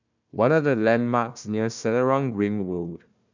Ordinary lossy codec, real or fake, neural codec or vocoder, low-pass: none; fake; codec, 16 kHz, 1 kbps, FunCodec, trained on Chinese and English, 50 frames a second; 7.2 kHz